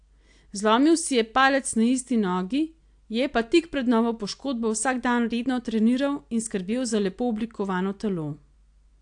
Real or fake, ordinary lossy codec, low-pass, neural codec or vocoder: real; AAC, 64 kbps; 9.9 kHz; none